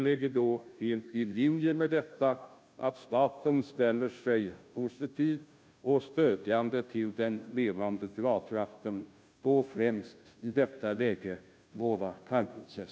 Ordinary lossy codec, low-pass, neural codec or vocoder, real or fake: none; none; codec, 16 kHz, 0.5 kbps, FunCodec, trained on Chinese and English, 25 frames a second; fake